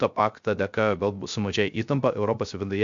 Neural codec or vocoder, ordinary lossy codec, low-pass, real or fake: codec, 16 kHz, 0.3 kbps, FocalCodec; MP3, 64 kbps; 7.2 kHz; fake